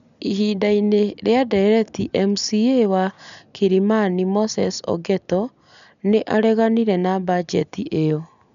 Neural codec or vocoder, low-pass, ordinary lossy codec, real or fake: none; 7.2 kHz; none; real